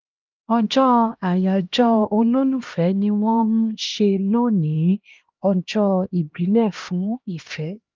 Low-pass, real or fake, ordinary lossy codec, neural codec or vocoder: 7.2 kHz; fake; Opus, 24 kbps; codec, 16 kHz, 1 kbps, X-Codec, HuBERT features, trained on LibriSpeech